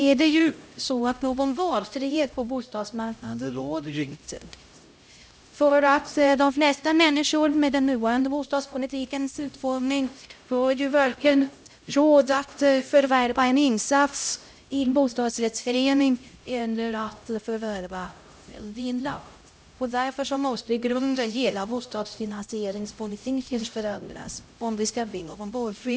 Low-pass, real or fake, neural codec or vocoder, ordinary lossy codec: none; fake; codec, 16 kHz, 0.5 kbps, X-Codec, HuBERT features, trained on LibriSpeech; none